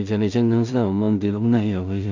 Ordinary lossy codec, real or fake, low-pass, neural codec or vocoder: none; fake; 7.2 kHz; codec, 16 kHz in and 24 kHz out, 0.4 kbps, LongCat-Audio-Codec, two codebook decoder